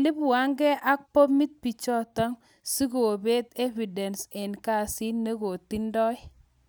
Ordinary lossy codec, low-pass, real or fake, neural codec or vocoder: none; none; real; none